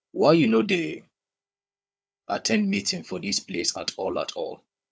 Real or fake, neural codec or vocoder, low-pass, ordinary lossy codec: fake; codec, 16 kHz, 4 kbps, FunCodec, trained on Chinese and English, 50 frames a second; none; none